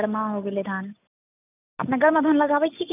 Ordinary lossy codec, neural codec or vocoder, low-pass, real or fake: none; none; 3.6 kHz; real